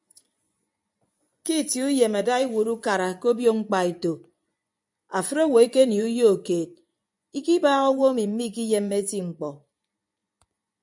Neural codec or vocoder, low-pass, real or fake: vocoder, 24 kHz, 100 mel bands, Vocos; 10.8 kHz; fake